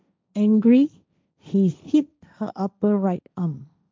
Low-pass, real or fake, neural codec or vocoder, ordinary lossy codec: none; fake; codec, 16 kHz, 1.1 kbps, Voila-Tokenizer; none